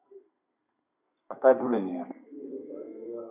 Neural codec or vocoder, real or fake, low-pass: codec, 32 kHz, 1.9 kbps, SNAC; fake; 3.6 kHz